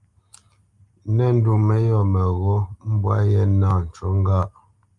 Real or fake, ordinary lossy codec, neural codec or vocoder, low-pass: real; Opus, 32 kbps; none; 10.8 kHz